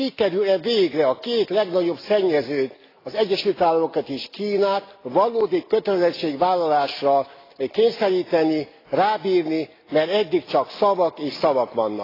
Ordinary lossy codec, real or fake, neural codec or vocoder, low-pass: AAC, 24 kbps; real; none; 5.4 kHz